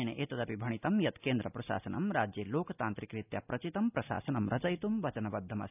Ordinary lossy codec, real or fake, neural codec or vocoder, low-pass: none; real; none; 3.6 kHz